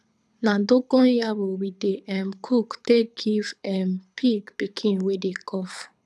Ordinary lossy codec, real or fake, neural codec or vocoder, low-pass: none; fake; codec, 24 kHz, 6 kbps, HILCodec; none